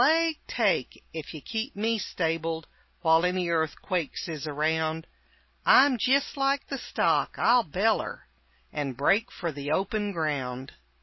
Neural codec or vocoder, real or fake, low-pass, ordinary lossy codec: none; real; 7.2 kHz; MP3, 24 kbps